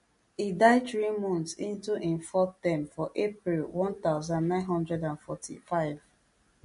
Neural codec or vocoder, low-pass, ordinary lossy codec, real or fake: none; 10.8 kHz; MP3, 48 kbps; real